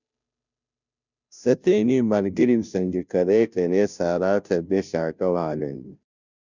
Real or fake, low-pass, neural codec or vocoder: fake; 7.2 kHz; codec, 16 kHz, 0.5 kbps, FunCodec, trained on Chinese and English, 25 frames a second